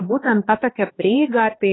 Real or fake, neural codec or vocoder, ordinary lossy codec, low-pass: fake; codec, 16 kHz, 2 kbps, X-Codec, HuBERT features, trained on LibriSpeech; AAC, 16 kbps; 7.2 kHz